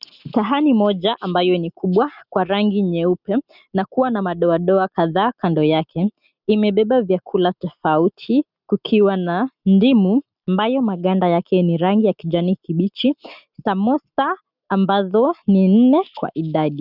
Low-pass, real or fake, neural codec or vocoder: 5.4 kHz; real; none